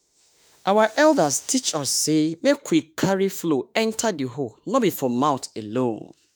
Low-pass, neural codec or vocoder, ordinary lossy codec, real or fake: none; autoencoder, 48 kHz, 32 numbers a frame, DAC-VAE, trained on Japanese speech; none; fake